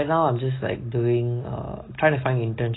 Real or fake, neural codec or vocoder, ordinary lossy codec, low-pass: real; none; AAC, 16 kbps; 7.2 kHz